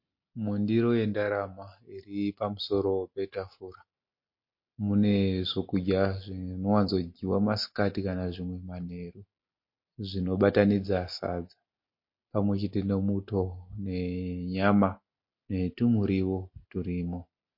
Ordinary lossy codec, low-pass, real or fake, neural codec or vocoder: MP3, 32 kbps; 5.4 kHz; real; none